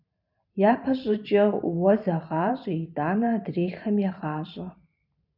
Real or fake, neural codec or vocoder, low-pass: fake; vocoder, 44.1 kHz, 128 mel bands every 512 samples, BigVGAN v2; 5.4 kHz